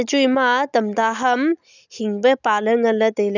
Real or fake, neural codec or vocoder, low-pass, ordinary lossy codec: real; none; 7.2 kHz; none